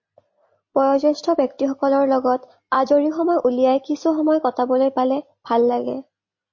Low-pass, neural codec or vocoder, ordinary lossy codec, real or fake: 7.2 kHz; none; MP3, 32 kbps; real